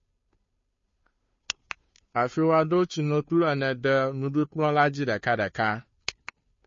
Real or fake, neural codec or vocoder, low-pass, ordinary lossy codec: fake; codec, 16 kHz, 2 kbps, FunCodec, trained on Chinese and English, 25 frames a second; 7.2 kHz; MP3, 32 kbps